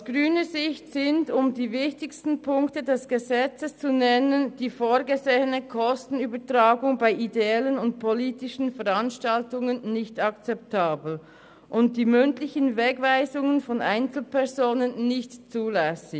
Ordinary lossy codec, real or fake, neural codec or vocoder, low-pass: none; real; none; none